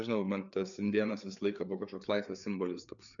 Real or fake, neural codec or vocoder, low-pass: fake; codec, 16 kHz, 4 kbps, FreqCodec, larger model; 7.2 kHz